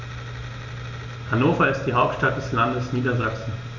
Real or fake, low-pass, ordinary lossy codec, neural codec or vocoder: real; 7.2 kHz; none; none